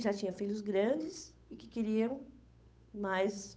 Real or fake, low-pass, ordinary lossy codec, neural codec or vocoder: fake; none; none; codec, 16 kHz, 8 kbps, FunCodec, trained on Chinese and English, 25 frames a second